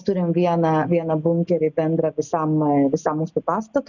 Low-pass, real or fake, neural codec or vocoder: 7.2 kHz; real; none